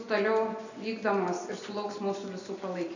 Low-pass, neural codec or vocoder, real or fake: 7.2 kHz; none; real